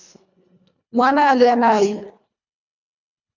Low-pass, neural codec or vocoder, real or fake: 7.2 kHz; codec, 24 kHz, 1.5 kbps, HILCodec; fake